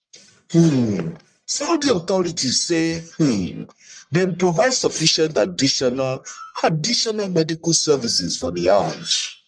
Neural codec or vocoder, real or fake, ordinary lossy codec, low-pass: codec, 44.1 kHz, 1.7 kbps, Pupu-Codec; fake; MP3, 96 kbps; 9.9 kHz